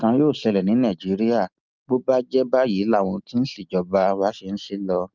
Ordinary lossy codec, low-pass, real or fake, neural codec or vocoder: Opus, 24 kbps; 7.2 kHz; real; none